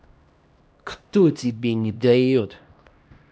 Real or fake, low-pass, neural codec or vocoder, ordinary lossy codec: fake; none; codec, 16 kHz, 1 kbps, X-Codec, HuBERT features, trained on LibriSpeech; none